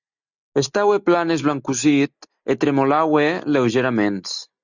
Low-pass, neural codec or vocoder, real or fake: 7.2 kHz; none; real